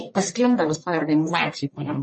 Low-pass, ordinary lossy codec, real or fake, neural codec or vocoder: 10.8 kHz; MP3, 32 kbps; fake; codec, 44.1 kHz, 1.7 kbps, Pupu-Codec